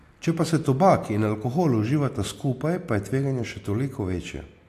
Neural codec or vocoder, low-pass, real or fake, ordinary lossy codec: none; 14.4 kHz; real; AAC, 64 kbps